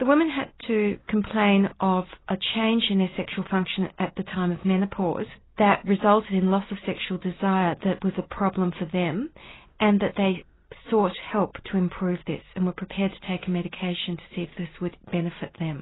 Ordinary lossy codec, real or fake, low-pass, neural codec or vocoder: AAC, 16 kbps; real; 7.2 kHz; none